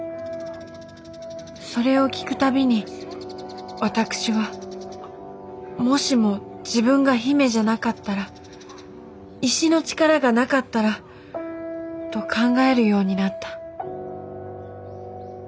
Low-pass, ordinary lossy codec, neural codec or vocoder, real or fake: none; none; none; real